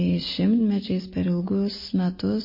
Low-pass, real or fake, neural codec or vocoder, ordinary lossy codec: 5.4 kHz; real; none; MP3, 24 kbps